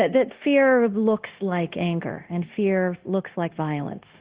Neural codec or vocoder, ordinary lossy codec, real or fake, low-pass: codec, 16 kHz, 0.9 kbps, LongCat-Audio-Codec; Opus, 16 kbps; fake; 3.6 kHz